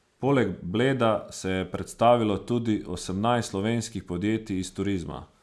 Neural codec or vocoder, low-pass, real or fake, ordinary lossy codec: none; none; real; none